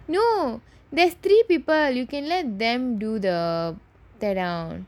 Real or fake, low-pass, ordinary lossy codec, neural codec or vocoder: real; 19.8 kHz; none; none